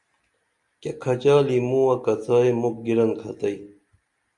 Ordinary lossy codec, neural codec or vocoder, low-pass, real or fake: Opus, 64 kbps; none; 10.8 kHz; real